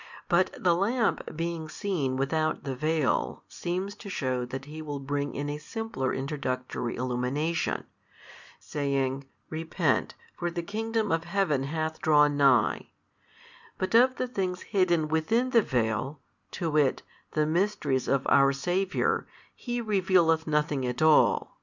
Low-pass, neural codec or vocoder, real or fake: 7.2 kHz; none; real